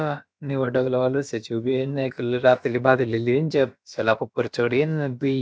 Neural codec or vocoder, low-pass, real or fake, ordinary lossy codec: codec, 16 kHz, about 1 kbps, DyCAST, with the encoder's durations; none; fake; none